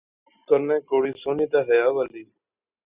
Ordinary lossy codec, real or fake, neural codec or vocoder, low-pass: Opus, 64 kbps; real; none; 3.6 kHz